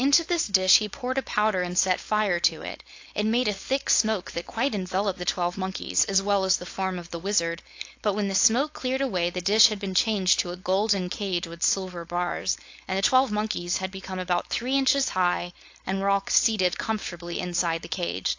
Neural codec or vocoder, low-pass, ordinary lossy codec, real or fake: codec, 16 kHz, 4.8 kbps, FACodec; 7.2 kHz; AAC, 48 kbps; fake